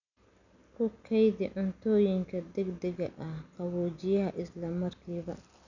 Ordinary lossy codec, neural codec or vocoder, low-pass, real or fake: none; none; 7.2 kHz; real